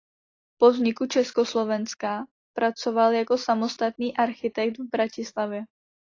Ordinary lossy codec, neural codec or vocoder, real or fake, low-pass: AAC, 32 kbps; none; real; 7.2 kHz